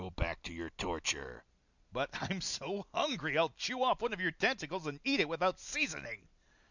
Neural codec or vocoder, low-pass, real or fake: none; 7.2 kHz; real